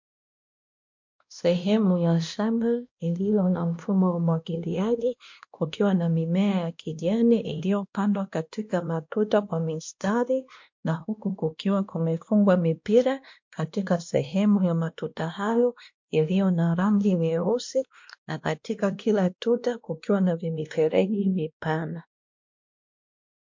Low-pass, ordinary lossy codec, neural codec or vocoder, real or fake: 7.2 kHz; MP3, 48 kbps; codec, 16 kHz, 1 kbps, X-Codec, WavLM features, trained on Multilingual LibriSpeech; fake